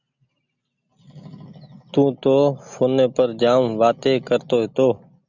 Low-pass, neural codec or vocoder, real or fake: 7.2 kHz; none; real